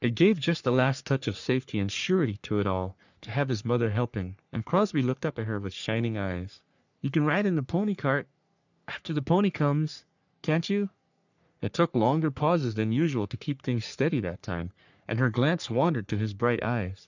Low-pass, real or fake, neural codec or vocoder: 7.2 kHz; fake; codec, 44.1 kHz, 3.4 kbps, Pupu-Codec